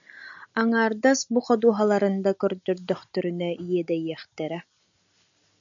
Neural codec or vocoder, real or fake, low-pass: none; real; 7.2 kHz